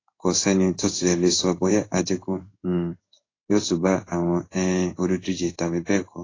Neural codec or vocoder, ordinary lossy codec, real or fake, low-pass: codec, 16 kHz in and 24 kHz out, 1 kbps, XY-Tokenizer; AAC, 32 kbps; fake; 7.2 kHz